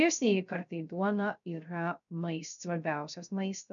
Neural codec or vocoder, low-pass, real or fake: codec, 16 kHz, 0.3 kbps, FocalCodec; 7.2 kHz; fake